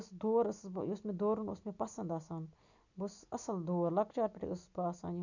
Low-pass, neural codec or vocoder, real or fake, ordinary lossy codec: 7.2 kHz; none; real; none